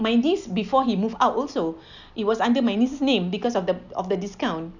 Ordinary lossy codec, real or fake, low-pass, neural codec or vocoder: none; real; 7.2 kHz; none